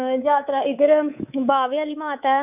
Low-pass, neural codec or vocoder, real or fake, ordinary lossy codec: 3.6 kHz; codec, 24 kHz, 3.1 kbps, DualCodec; fake; none